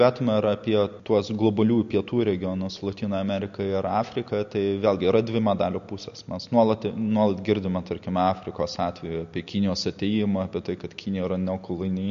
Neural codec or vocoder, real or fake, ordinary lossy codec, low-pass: none; real; MP3, 48 kbps; 7.2 kHz